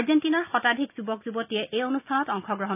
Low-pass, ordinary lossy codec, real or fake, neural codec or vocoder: 3.6 kHz; none; real; none